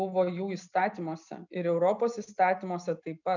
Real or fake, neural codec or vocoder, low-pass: real; none; 7.2 kHz